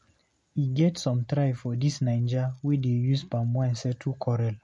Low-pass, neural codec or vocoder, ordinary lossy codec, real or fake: 10.8 kHz; none; MP3, 48 kbps; real